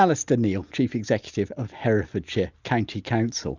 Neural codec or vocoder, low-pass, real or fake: none; 7.2 kHz; real